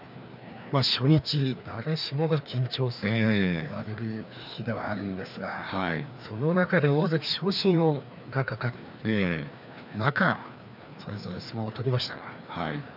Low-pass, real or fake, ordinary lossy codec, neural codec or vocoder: 5.4 kHz; fake; none; codec, 16 kHz, 2 kbps, FreqCodec, larger model